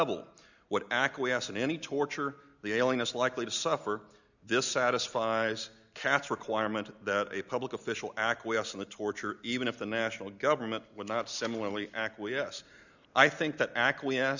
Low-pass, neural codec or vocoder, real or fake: 7.2 kHz; none; real